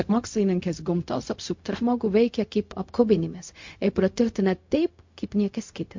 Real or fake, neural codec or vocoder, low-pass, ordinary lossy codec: fake; codec, 16 kHz, 0.4 kbps, LongCat-Audio-Codec; 7.2 kHz; MP3, 48 kbps